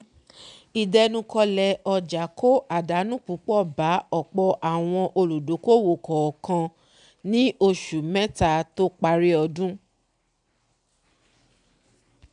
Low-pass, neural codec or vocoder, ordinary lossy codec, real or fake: 9.9 kHz; none; none; real